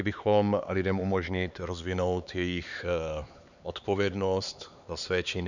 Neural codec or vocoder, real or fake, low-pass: codec, 16 kHz, 4 kbps, X-Codec, HuBERT features, trained on LibriSpeech; fake; 7.2 kHz